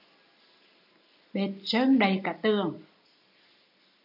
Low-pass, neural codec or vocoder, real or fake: 5.4 kHz; none; real